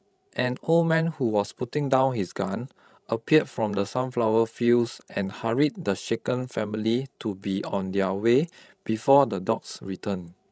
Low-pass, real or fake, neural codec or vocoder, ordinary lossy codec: none; fake; codec, 16 kHz, 16 kbps, FreqCodec, larger model; none